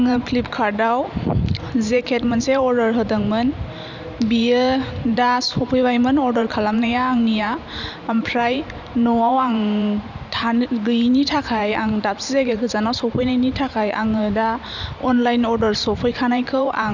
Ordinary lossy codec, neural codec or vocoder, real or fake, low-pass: none; vocoder, 44.1 kHz, 128 mel bands every 512 samples, BigVGAN v2; fake; 7.2 kHz